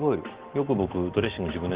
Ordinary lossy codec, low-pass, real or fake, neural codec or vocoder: Opus, 16 kbps; 3.6 kHz; real; none